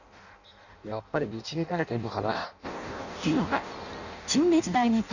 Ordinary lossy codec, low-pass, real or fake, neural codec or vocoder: none; 7.2 kHz; fake; codec, 16 kHz in and 24 kHz out, 0.6 kbps, FireRedTTS-2 codec